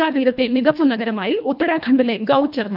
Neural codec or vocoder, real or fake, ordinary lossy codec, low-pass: codec, 24 kHz, 1.5 kbps, HILCodec; fake; none; 5.4 kHz